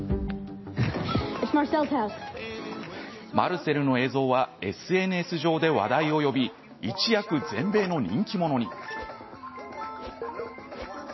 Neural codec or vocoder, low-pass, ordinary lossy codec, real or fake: none; 7.2 kHz; MP3, 24 kbps; real